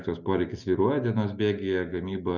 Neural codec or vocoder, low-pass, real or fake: none; 7.2 kHz; real